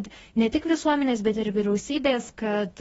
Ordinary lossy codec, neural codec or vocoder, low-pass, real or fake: AAC, 24 kbps; codec, 44.1 kHz, 2.6 kbps, DAC; 19.8 kHz; fake